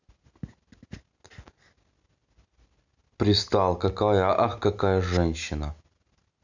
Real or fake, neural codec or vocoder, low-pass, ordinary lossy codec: real; none; 7.2 kHz; none